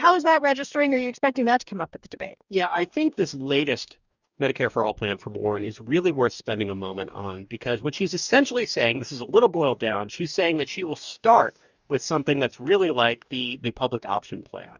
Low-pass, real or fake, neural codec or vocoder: 7.2 kHz; fake; codec, 44.1 kHz, 2.6 kbps, DAC